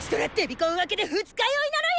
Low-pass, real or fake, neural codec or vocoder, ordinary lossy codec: none; real; none; none